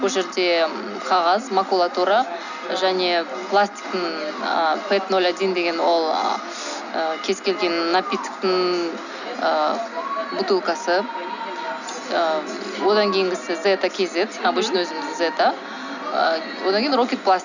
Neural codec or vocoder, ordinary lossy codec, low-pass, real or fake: none; none; 7.2 kHz; real